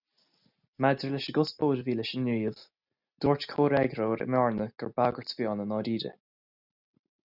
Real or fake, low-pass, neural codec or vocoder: real; 5.4 kHz; none